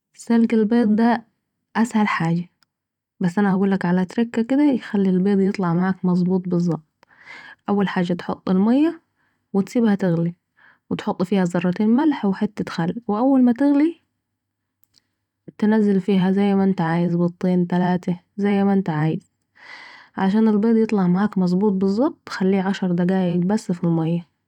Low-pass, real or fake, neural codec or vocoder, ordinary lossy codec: 19.8 kHz; fake; vocoder, 44.1 kHz, 128 mel bands every 512 samples, BigVGAN v2; none